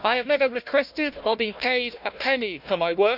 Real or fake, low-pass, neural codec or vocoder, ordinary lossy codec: fake; 5.4 kHz; codec, 16 kHz, 1 kbps, FunCodec, trained on Chinese and English, 50 frames a second; none